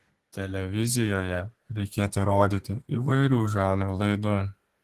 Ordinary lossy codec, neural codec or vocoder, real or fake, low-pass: Opus, 16 kbps; codec, 32 kHz, 1.9 kbps, SNAC; fake; 14.4 kHz